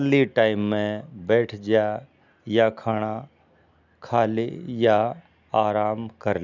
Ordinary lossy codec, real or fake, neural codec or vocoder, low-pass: none; real; none; 7.2 kHz